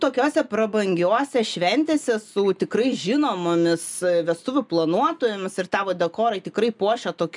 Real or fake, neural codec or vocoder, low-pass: real; none; 10.8 kHz